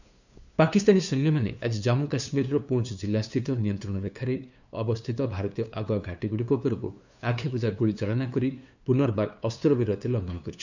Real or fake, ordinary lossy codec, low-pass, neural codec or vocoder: fake; none; 7.2 kHz; codec, 16 kHz, 2 kbps, FunCodec, trained on LibriTTS, 25 frames a second